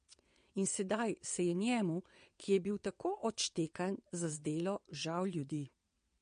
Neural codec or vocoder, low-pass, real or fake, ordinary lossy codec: vocoder, 22.05 kHz, 80 mel bands, WaveNeXt; 9.9 kHz; fake; MP3, 48 kbps